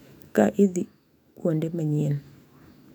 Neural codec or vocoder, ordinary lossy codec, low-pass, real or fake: autoencoder, 48 kHz, 128 numbers a frame, DAC-VAE, trained on Japanese speech; none; 19.8 kHz; fake